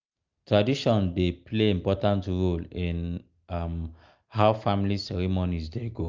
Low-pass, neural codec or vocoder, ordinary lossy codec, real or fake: 7.2 kHz; none; Opus, 24 kbps; real